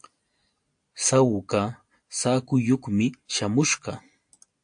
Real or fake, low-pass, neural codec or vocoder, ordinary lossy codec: real; 9.9 kHz; none; AAC, 64 kbps